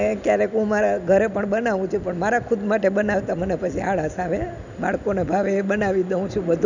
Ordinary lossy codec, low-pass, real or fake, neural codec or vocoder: none; 7.2 kHz; real; none